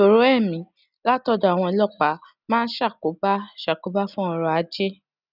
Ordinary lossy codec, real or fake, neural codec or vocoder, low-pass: none; real; none; 5.4 kHz